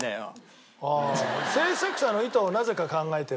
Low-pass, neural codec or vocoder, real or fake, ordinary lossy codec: none; none; real; none